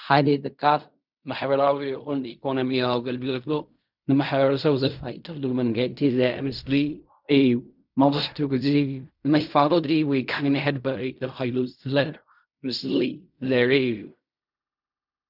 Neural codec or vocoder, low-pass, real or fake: codec, 16 kHz in and 24 kHz out, 0.4 kbps, LongCat-Audio-Codec, fine tuned four codebook decoder; 5.4 kHz; fake